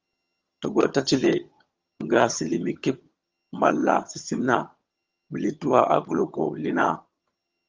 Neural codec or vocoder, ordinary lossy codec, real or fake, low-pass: vocoder, 22.05 kHz, 80 mel bands, HiFi-GAN; Opus, 32 kbps; fake; 7.2 kHz